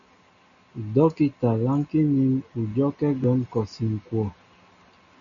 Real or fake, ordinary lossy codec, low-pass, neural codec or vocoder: real; MP3, 96 kbps; 7.2 kHz; none